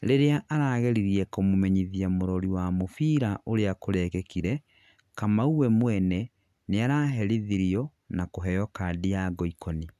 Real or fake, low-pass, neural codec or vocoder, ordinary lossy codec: real; 14.4 kHz; none; none